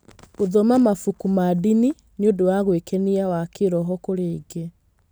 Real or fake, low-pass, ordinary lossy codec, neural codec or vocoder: real; none; none; none